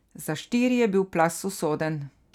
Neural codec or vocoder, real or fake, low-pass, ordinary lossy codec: none; real; 19.8 kHz; none